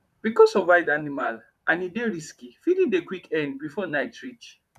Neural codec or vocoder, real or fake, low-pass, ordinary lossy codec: vocoder, 44.1 kHz, 128 mel bands, Pupu-Vocoder; fake; 14.4 kHz; none